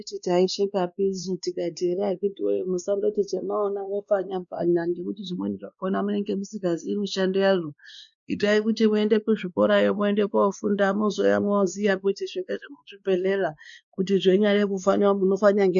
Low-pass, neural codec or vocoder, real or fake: 7.2 kHz; codec, 16 kHz, 2 kbps, X-Codec, WavLM features, trained on Multilingual LibriSpeech; fake